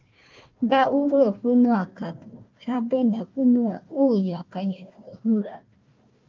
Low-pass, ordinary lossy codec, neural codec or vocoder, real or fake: 7.2 kHz; Opus, 24 kbps; codec, 16 kHz, 1 kbps, FunCodec, trained on Chinese and English, 50 frames a second; fake